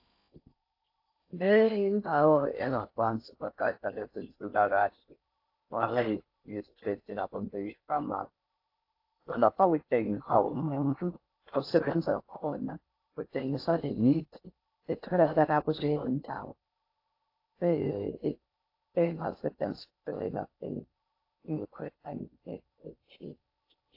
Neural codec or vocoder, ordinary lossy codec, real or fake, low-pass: codec, 16 kHz in and 24 kHz out, 0.6 kbps, FocalCodec, streaming, 4096 codes; AAC, 32 kbps; fake; 5.4 kHz